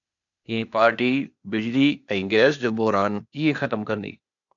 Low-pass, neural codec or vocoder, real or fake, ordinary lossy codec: 7.2 kHz; codec, 16 kHz, 0.8 kbps, ZipCodec; fake; MP3, 96 kbps